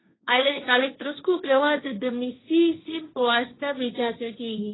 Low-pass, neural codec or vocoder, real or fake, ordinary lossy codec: 7.2 kHz; codec, 16 kHz, 1.1 kbps, Voila-Tokenizer; fake; AAC, 16 kbps